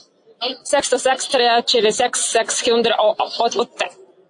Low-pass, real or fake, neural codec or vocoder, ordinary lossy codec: 9.9 kHz; real; none; AAC, 64 kbps